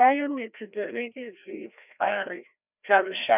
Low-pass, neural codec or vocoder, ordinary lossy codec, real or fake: 3.6 kHz; codec, 16 kHz, 1 kbps, FreqCodec, larger model; none; fake